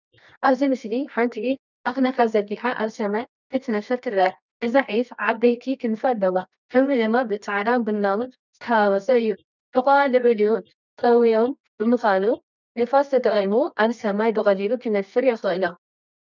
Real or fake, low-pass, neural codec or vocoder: fake; 7.2 kHz; codec, 24 kHz, 0.9 kbps, WavTokenizer, medium music audio release